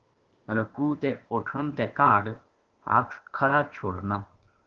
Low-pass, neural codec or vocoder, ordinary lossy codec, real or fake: 7.2 kHz; codec, 16 kHz, 0.8 kbps, ZipCodec; Opus, 16 kbps; fake